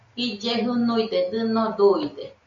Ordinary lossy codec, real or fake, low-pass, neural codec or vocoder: MP3, 64 kbps; real; 7.2 kHz; none